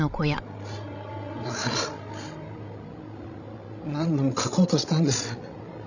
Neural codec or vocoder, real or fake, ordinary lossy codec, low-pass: codec, 16 kHz, 16 kbps, FreqCodec, larger model; fake; none; 7.2 kHz